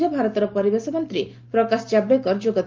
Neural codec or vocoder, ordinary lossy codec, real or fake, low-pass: none; Opus, 32 kbps; real; 7.2 kHz